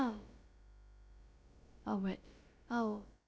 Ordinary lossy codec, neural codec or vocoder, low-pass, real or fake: none; codec, 16 kHz, about 1 kbps, DyCAST, with the encoder's durations; none; fake